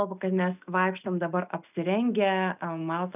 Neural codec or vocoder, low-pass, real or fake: none; 3.6 kHz; real